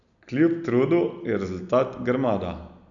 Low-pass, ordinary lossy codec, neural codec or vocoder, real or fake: 7.2 kHz; none; none; real